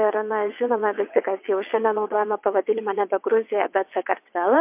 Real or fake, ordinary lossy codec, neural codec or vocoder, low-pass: fake; MP3, 32 kbps; vocoder, 22.05 kHz, 80 mel bands, WaveNeXt; 3.6 kHz